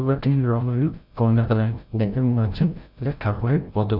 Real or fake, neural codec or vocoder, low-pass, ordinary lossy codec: fake; codec, 16 kHz, 0.5 kbps, FreqCodec, larger model; 5.4 kHz; none